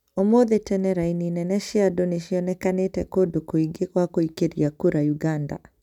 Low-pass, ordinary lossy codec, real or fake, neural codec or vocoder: 19.8 kHz; none; real; none